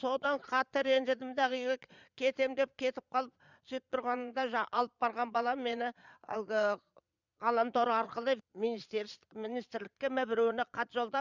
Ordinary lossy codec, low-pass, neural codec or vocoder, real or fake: none; 7.2 kHz; vocoder, 22.05 kHz, 80 mel bands, Vocos; fake